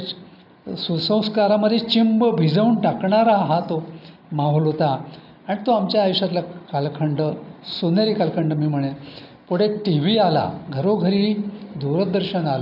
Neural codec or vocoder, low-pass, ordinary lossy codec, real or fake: none; 5.4 kHz; none; real